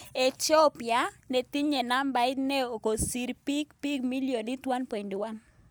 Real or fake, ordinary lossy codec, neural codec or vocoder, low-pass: real; none; none; none